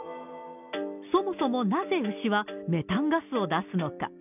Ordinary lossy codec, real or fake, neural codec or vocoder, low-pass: none; real; none; 3.6 kHz